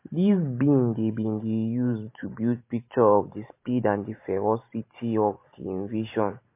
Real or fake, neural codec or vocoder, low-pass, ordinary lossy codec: fake; vocoder, 44.1 kHz, 128 mel bands every 512 samples, BigVGAN v2; 3.6 kHz; MP3, 24 kbps